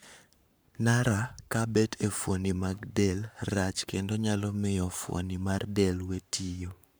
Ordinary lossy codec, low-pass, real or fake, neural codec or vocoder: none; none; fake; codec, 44.1 kHz, 7.8 kbps, Pupu-Codec